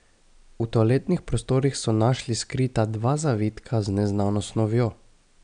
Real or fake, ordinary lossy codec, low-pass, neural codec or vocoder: real; none; 9.9 kHz; none